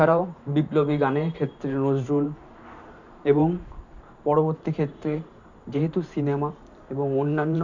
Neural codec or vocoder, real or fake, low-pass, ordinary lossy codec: vocoder, 44.1 kHz, 128 mel bands every 512 samples, BigVGAN v2; fake; 7.2 kHz; none